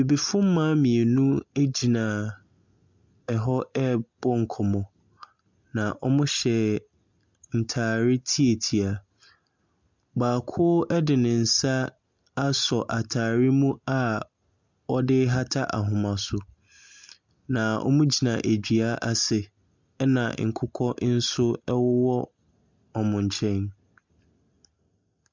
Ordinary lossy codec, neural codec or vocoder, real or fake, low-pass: MP3, 64 kbps; none; real; 7.2 kHz